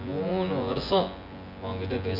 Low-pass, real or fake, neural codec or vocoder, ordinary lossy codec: 5.4 kHz; fake; vocoder, 24 kHz, 100 mel bands, Vocos; none